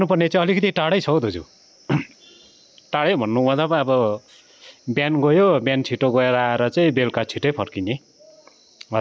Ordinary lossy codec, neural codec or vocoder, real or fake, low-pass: none; none; real; none